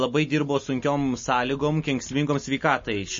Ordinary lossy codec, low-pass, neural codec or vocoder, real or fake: MP3, 32 kbps; 7.2 kHz; none; real